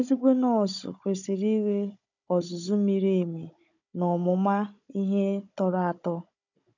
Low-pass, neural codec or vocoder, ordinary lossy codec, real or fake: 7.2 kHz; codec, 16 kHz, 16 kbps, FunCodec, trained on Chinese and English, 50 frames a second; none; fake